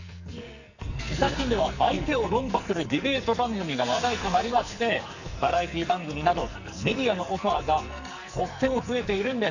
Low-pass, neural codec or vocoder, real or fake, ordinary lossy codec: 7.2 kHz; codec, 44.1 kHz, 2.6 kbps, SNAC; fake; none